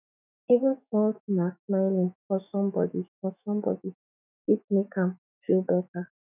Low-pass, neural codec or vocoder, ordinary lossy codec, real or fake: 3.6 kHz; autoencoder, 48 kHz, 128 numbers a frame, DAC-VAE, trained on Japanese speech; none; fake